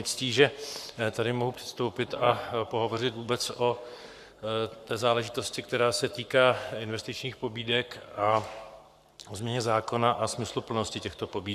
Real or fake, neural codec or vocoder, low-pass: fake; codec, 44.1 kHz, 7.8 kbps, Pupu-Codec; 14.4 kHz